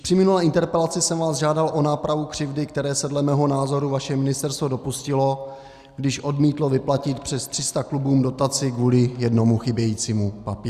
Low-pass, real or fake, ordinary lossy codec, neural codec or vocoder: 14.4 kHz; real; Opus, 64 kbps; none